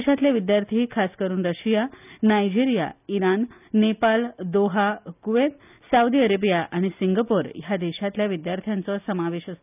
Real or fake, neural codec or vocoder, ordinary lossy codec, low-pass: real; none; none; 3.6 kHz